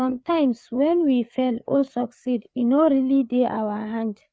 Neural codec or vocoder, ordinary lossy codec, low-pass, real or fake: codec, 16 kHz, 4 kbps, FreqCodec, larger model; none; none; fake